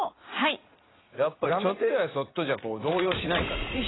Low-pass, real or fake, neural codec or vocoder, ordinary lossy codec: 7.2 kHz; fake; vocoder, 44.1 kHz, 80 mel bands, Vocos; AAC, 16 kbps